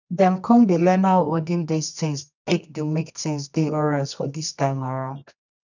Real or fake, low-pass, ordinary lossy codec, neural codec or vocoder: fake; 7.2 kHz; none; codec, 24 kHz, 0.9 kbps, WavTokenizer, medium music audio release